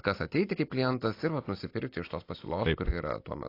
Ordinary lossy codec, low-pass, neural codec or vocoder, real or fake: AAC, 32 kbps; 5.4 kHz; none; real